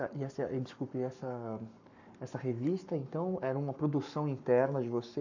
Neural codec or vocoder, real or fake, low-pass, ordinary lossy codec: codec, 44.1 kHz, 7.8 kbps, Pupu-Codec; fake; 7.2 kHz; none